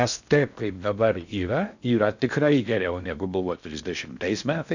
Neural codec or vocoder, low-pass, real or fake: codec, 16 kHz in and 24 kHz out, 0.6 kbps, FocalCodec, streaming, 2048 codes; 7.2 kHz; fake